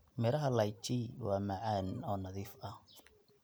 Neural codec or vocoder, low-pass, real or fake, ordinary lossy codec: none; none; real; none